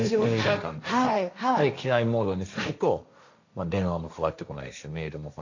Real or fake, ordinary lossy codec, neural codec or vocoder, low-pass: fake; AAC, 48 kbps; codec, 16 kHz, 1.1 kbps, Voila-Tokenizer; 7.2 kHz